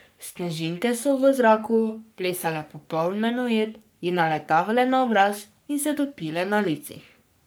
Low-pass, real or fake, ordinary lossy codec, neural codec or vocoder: none; fake; none; codec, 44.1 kHz, 3.4 kbps, Pupu-Codec